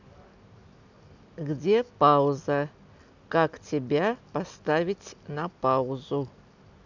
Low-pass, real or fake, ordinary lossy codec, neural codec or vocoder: 7.2 kHz; real; none; none